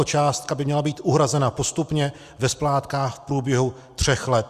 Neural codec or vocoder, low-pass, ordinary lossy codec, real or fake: none; 14.4 kHz; Opus, 64 kbps; real